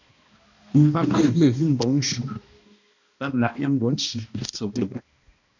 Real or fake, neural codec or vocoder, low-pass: fake; codec, 16 kHz, 1 kbps, X-Codec, HuBERT features, trained on balanced general audio; 7.2 kHz